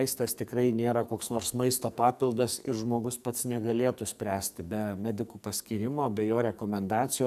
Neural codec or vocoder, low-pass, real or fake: codec, 44.1 kHz, 2.6 kbps, SNAC; 14.4 kHz; fake